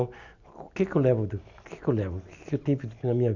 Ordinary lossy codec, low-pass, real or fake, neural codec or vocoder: none; 7.2 kHz; real; none